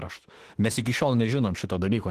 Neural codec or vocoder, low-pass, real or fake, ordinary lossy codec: autoencoder, 48 kHz, 32 numbers a frame, DAC-VAE, trained on Japanese speech; 14.4 kHz; fake; Opus, 16 kbps